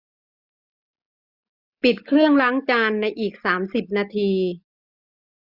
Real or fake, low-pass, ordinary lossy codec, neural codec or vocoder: real; 5.4 kHz; Opus, 64 kbps; none